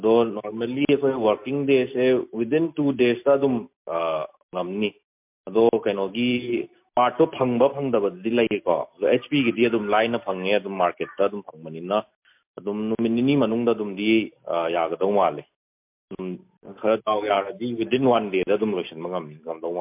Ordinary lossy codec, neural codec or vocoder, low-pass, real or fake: MP3, 32 kbps; none; 3.6 kHz; real